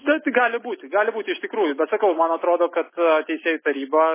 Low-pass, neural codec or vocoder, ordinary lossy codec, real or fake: 3.6 kHz; none; MP3, 16 kbps; real